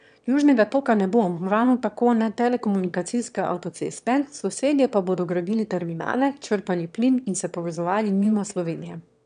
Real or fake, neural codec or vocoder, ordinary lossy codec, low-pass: fake; autoencoder, 22.05 kHz, a latent of 192 numbers a frame, VITS, trained on one speaker; none; 9.9 kHz